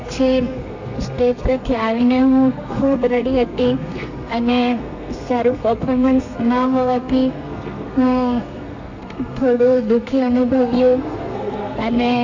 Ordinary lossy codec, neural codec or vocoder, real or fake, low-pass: AAC, 48 kbps; codec, 32 kHz, 1.9 kbps, SNAC; fake; 7.2 kHz